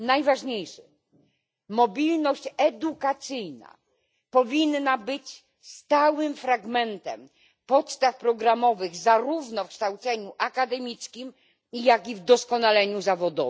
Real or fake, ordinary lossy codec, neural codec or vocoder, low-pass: real; none; none; none